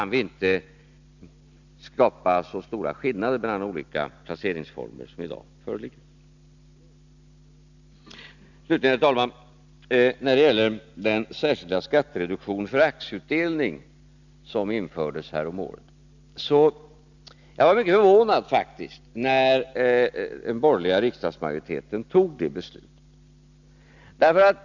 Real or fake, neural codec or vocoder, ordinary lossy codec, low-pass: real; none; none; 7.2 kHz